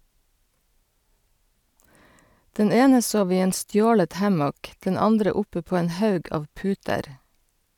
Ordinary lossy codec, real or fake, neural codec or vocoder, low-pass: none; real; none; 19.8 kHz